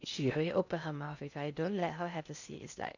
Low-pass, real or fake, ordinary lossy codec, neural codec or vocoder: 7.2 kHz; fake; none; codec, 16 kHz in and 24 kHz out, 0.6 kbps, FocalCodec, streaming, 4096 codes